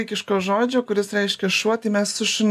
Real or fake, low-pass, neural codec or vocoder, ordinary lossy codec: real; 14.4 kHz; none; AAC, 96 kbps